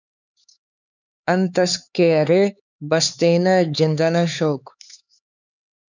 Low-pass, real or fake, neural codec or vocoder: 7.2 kHz; fake; codec, 16 kHz, 4 kbps, X-Codec, HuBERT features, trained on LibriSpeech